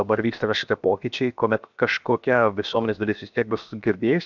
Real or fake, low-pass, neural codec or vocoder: fake; 7.2 kHz; codec, 16 kHz, 0.7 kbps, FocalCodec